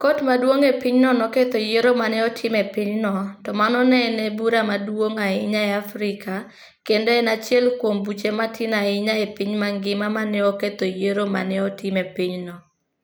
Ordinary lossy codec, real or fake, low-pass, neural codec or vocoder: none; real; none; none